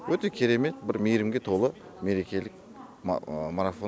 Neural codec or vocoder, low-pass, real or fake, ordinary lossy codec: none; none; real; none